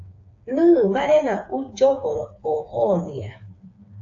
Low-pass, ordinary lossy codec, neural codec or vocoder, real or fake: 7.2 kHz; AAC, 48 kbps; codec, 16 kHz, 4 kbps, FreqCodec, smaller model; fake